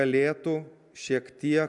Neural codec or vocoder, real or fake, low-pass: none; real; 10.8 kHz